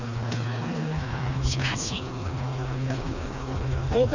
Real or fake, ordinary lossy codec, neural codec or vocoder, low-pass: fake; none; codec, 16 kHz, 2 kbps, FreqCodec, smaller model; 7.2 kHz